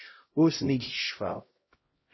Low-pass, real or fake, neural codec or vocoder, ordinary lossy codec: 7.2 kHz; fake; codec, 16 kHz, 0.5 kbps, X-Codec, HuBERT features, trained on LibriSpeech; MP3, 24 kbps